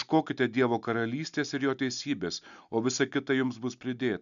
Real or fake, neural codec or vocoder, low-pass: real; none; 7.2 kHz